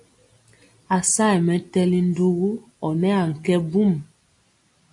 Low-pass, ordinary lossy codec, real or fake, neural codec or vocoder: 10.8 kHz; AAC, 64 kbps; real; none